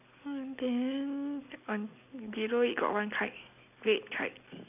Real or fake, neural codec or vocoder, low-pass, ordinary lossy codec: real; none; 3.6 kHz; none